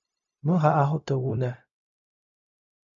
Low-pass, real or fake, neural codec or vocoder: 7.2 kHz; fake; codec, 16 kHz, 0.4 kbps, LongCat-Audio-Codec